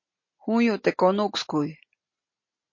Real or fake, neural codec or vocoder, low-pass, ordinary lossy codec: real; none; 7.2 kHz; MP3, 32 kbps